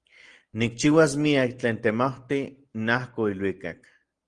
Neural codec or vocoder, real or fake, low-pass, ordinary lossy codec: none; real; 9.9 kHz; Opus, 24 kbps